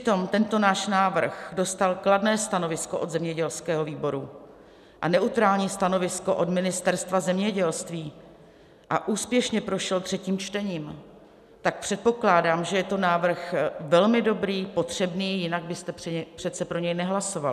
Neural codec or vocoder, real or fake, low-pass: none; real; 14.4 kHz